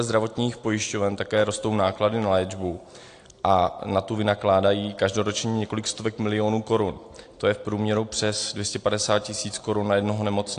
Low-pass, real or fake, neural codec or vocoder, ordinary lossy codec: 9.9 kHz; real; none; AAC, 48 kbps